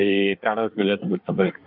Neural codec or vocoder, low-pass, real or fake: codec, 24 kHz, 1 kbps, SNAC; 5.4 kHz; fake